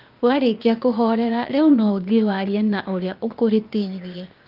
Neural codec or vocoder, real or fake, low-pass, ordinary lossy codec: codec, 16 kHz, 0.8 kbps, ZipCodec; fake; 5.4 kHz; Opus, 24 kbps